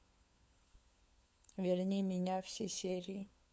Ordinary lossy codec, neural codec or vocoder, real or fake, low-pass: none; codec, 16 kHz, 4 kbps, FunCodec, trained on LibriTTS, 50 frames a second; fake; none